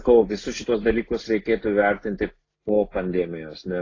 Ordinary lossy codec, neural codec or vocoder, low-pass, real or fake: AAC, 32 kbps; none; 7.2 kHz; real